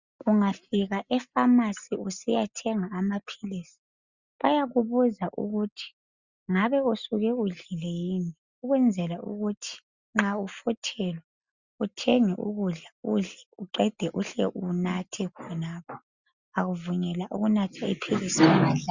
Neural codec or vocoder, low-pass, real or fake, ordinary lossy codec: none; 7.2 kHz; real; Opus, 64 kbps